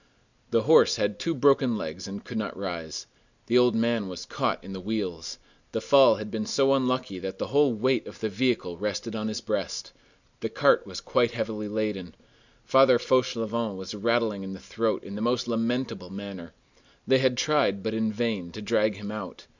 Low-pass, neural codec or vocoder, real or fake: 7.2 kHz; none; real